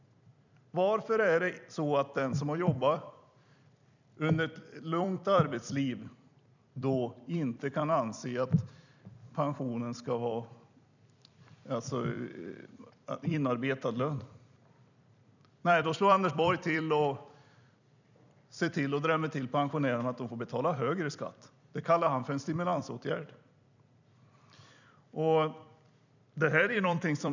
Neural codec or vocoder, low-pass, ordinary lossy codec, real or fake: vocoder, 44.1 kHz, 128 mel bands every 256 samples, BigVGAN v2; 7.2 kHz; none; fake